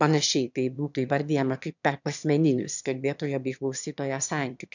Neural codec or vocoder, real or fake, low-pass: autoencoder, 22.05 kHz, a latent of 192 numbers a frame, VITS, trained on one speaker; fake; 7.2 kHz